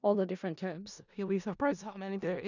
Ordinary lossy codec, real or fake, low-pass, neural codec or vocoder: none; fake; 7.2 kHz; codec, 16 kHz in and 24 kHz out, 0.4 kbps, LongCat-Audio-Codec, four codebook decoder